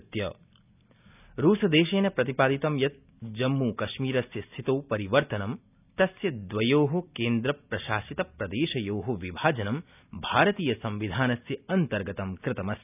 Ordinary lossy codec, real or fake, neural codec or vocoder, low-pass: none; real; none; 3.6 kHz